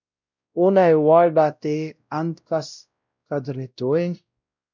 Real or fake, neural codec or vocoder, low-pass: fake; codec, 16 kHz, 0.5 kbps, X-Codec, WavLM features, trained on Multilingual LibriSpeech; 7.2 kHz